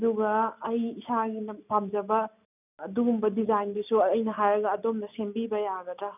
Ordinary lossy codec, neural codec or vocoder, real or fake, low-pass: none; none; real; 3.6 kHz